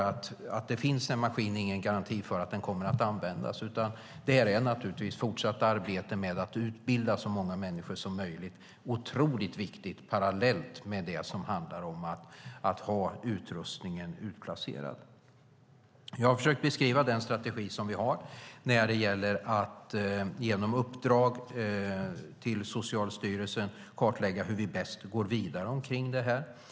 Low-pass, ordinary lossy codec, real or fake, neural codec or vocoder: none; none; real; none